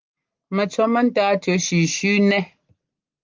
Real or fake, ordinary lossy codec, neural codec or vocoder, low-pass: real; Opus, 24 kbps; none; 7.2 kHz